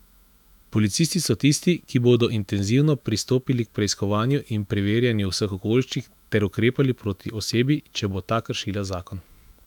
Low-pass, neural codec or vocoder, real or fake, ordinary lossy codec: 19.8 kHz; autoencoder, 48 kHz, 128 numbers a frame, DAC-VAE, trained on Japanese speech; fake; none